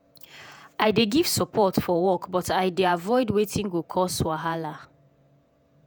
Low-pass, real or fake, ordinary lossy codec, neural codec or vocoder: none; fake; none; vocoder, 48 kHz, 128 mel bands, Vocos